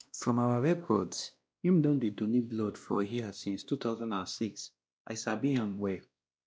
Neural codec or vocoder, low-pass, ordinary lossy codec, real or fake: codec, 16 kHz, 1 kbps, X-Codec, WavLM features, trained on Multilingual LibriSpeech; none; none; fake